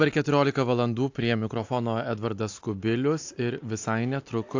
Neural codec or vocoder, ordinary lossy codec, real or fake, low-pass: none; AAC, 48 kbps; real; 7.2 kHz